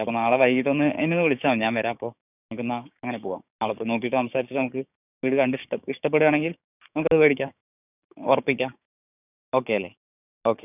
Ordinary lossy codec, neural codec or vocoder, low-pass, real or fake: none; codec, 16 kHz, 6 kbps, DAC; 3.6 kHz; fake